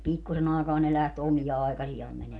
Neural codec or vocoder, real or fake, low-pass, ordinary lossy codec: none; real; none; none